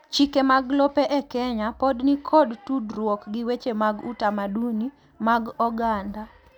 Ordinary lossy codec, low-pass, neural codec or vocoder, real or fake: none; 19.8 kHz; none; real